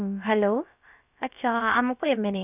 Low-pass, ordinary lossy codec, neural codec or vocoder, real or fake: 3.6 kHz; none; codec, 16 kHz, about 1 kbps, DyCAST, with the encoder's durations; fake